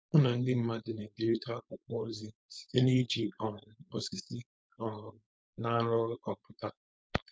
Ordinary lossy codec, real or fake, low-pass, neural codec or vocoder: none; fake; none; codec, 16 kHz, 4.8 kbps, FACodec